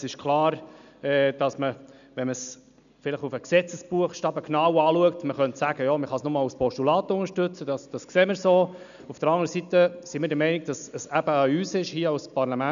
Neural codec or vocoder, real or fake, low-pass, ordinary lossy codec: none; real; 7.2 kHz; none